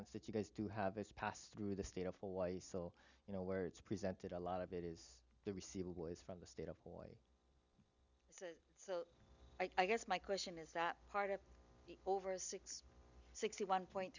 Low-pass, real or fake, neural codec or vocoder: 7.2 kHz; real; none